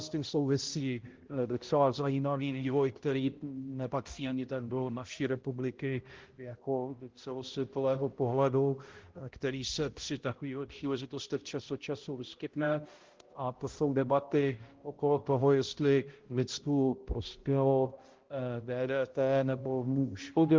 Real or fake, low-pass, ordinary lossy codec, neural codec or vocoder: fake; 7.2 kHz; Opus, 16 kbps; codec, 16 kHz, 0.5 kbps, X-Codec, HuBERT features, trained on balanced general audio